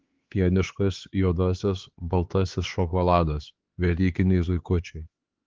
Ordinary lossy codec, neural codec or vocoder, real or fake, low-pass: Opus, 16 kbps; codec, 16 kHz, 4 kbps, X-Codec, HuBERT features, trained on LibriSpeech; fake; 7.2 kHz